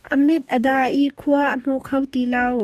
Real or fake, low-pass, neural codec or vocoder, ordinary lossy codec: fake; 14.4 kHz; codec, 44.1 kHz, 2.6 kbps, DAC; AAC, 96 kbps